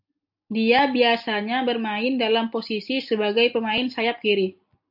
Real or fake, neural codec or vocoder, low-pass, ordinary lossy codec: real; none; 5.4 kHz; AAC, 48 kbps